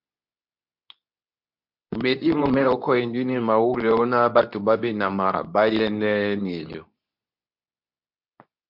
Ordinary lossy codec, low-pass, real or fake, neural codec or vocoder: MP3, 48 kbps; 5.4 kHz; fake; codec, 24 kHz, 0.9 kbps, WavTokenizer, medium speech release version 2